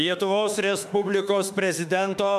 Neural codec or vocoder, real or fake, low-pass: autoencoder, 48 kHz, 32 numbers a frame, DAC-VAE, trained on Japanese speech; fake; 14.4 kHz